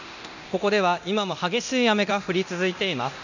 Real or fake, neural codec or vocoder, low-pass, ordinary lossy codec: fake; codec, 24 kHz, 0.9 kbps, DualCodec; 7.2 kHz; none